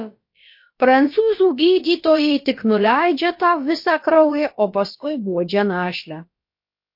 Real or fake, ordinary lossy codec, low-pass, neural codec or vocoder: fake; MP3, 32 kbps; 5.4 kHz; codec, 16 kHz, about 1 kbps, DyCAST, with the encoder's durations